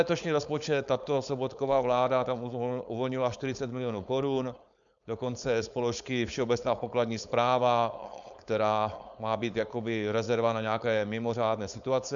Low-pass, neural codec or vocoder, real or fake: 7.2 kHz; codec, 16 kHz, 4.8 kbps, FACodec; fake